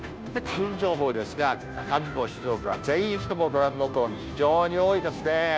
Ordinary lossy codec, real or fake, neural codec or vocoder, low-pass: none; fake; codec, 16 kHz, 0.5 kbps, FunCodec, trained on Chinese and English, 25 frames a second; none